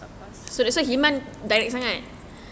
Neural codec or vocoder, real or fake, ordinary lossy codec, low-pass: none; real; none; none